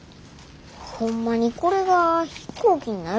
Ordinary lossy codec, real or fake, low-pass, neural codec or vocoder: none; real; none; none